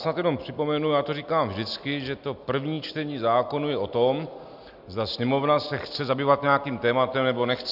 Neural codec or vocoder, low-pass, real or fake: none; 5.4 kHz; real